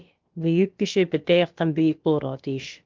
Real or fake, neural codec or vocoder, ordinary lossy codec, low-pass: fake; codec, 16 kHz, about 1 kbps, DyCAST, with the encoder's durations; Opus, 32 kbps; 7.2 kHz